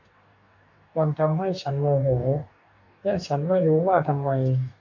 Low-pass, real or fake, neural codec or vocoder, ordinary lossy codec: 7.2 kHz; fake; codec, 44.1 kHz, 2.6 kbps, DAC; AAC, 48 kbps